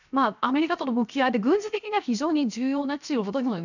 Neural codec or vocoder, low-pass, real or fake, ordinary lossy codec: codec, 16 kHz, 0.7 kbps, FocalCodec; 7.2 kHz; fake; none